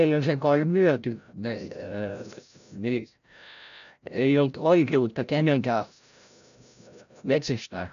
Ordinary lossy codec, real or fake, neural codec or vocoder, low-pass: MP3, 96 kbps; fake; codec, 16 kHz, 0.5 kbps, FreqCodec, larger model; 7.2 kHz